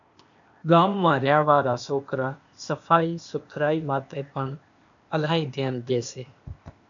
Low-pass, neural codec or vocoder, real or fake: 7.2 kHz; codec, 16 kHz, 0.8 kbps, ZipCodec; fake